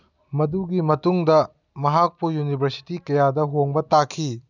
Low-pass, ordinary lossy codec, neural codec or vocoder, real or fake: 7.2 kHz; none; none; real